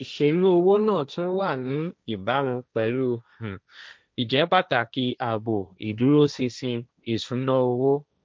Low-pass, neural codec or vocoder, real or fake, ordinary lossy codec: none; codec, 16 kHz, 1.1 kbps, Voila-Tokenizer; fake; none